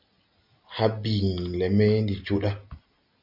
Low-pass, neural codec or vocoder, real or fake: 5.4 kHz; none; real